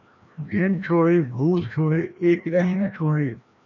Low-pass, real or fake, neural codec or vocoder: 7.2 kHz; fake; codec, 16 kHz, 1 kbps, FreqCodec, larger model